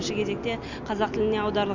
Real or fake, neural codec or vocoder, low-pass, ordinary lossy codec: real; none; 7.2 kHz; none